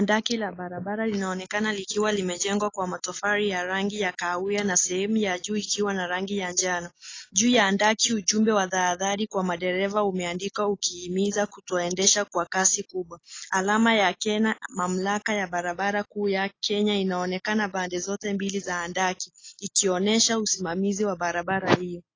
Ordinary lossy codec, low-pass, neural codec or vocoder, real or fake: AAC, 32 kbps; 7.2 kHz; none; real